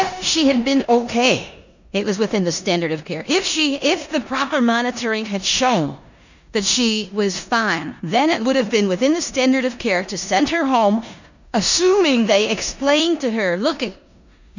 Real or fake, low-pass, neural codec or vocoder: fake; 7.2 kHz; codec, 16 kHz in and 24 kHz out, 0.9 kbps, LongCat-Audio-Codec, four codebook decoder